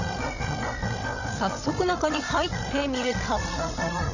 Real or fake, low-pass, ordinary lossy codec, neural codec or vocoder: fake; 7.2 kHz; none; codec, 16 kHz, 16 kbps, FreqCodec, larger model